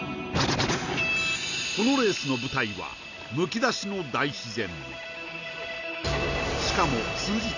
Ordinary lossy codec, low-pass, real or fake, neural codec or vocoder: none; 7.2 kHz; real; none